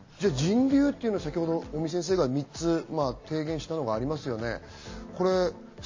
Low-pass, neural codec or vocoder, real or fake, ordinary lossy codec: 7.2 kHz; none; real; MP3, 32 kbps